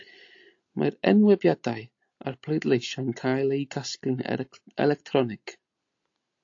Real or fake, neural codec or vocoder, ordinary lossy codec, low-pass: real; none; AAC, 48 kbps; 7.2 kHz